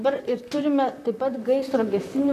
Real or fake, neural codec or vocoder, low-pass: fake; vocoder, 44.1 kHz, 128 mel bands, Pupu-Vocoder; 14.4 kHz